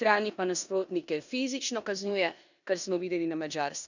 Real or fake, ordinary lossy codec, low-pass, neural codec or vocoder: fake; none; 7.2 kHz; codec, 16 kHz in and 24 kHz out, 0.9 kbps, LongCat-Audio-Codec, four codebook decoder